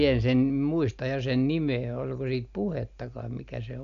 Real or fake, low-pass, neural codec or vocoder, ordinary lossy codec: real; 7.2 kHz; none; none